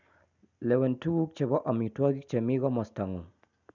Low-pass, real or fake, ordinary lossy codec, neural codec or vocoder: 7.2 kHz; real; none; none